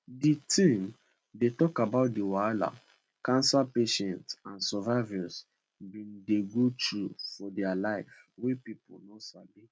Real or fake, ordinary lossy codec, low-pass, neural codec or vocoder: real; none; none; none